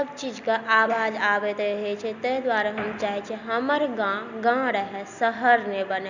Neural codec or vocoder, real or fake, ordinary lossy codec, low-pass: none; real; none; 7.2 kHz